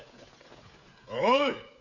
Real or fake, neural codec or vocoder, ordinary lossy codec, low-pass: fake; codec, 16 kHz, 16 kbps, FreqCodec, smaller model; none; 7.2 kHz